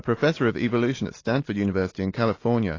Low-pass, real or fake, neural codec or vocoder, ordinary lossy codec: 7.2 kHz; real; none; AAC, 32 kbps